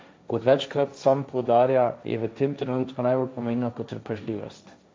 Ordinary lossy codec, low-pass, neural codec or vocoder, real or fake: none; none; codec, 16 kHz, 1.1 kbps, Voila-Tokenizer; fake